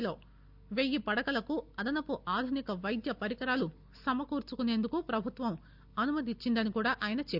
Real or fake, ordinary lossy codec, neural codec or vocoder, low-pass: real; Opus, 64 kbps; none; 5.4 kHz